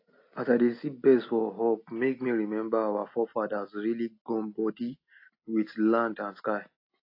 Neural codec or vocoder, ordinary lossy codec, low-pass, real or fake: none; AAC, 32 kbps; 5.4 kHz; real